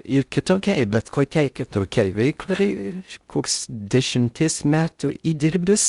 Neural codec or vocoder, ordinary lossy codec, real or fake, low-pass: codec, 16 kHz in and 24 kHz out, 0.6 kbps, FocalCodec, streaming, 2048 codes; Opus, 64 kbps; fake; 10.8 kHz